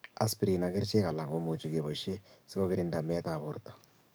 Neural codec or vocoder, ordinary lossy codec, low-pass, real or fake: codec, 44.1 kHz, 7.8 kbps, DAC; none; none; fake